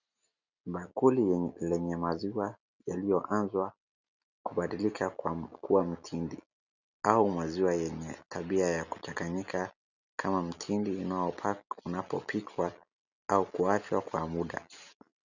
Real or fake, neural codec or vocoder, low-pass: real; none; 7.2 kHz